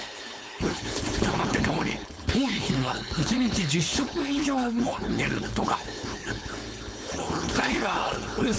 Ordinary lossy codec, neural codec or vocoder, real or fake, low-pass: none; codec, 16 kHz, 4.8 kbps, FACodec; fake; none